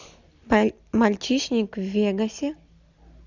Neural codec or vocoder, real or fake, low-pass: none; real; 7.2 kHz